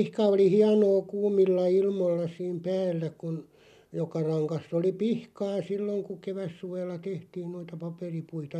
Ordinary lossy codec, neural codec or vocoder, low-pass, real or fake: none; none; 14.4 kHz; real